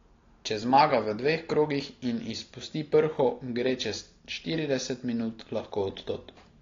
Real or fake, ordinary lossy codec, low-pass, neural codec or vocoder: real; AAC, 32 kbps; 7.2 kHz; none